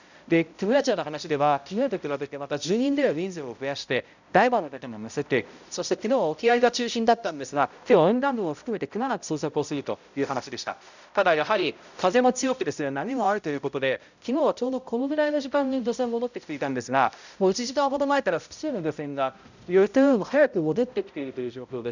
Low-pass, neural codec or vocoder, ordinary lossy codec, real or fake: 7.2 kHz; codec, 16 kHz, 0.5 kbps, X-Codec, HuBERT features, trained on balanced general audio; none; fake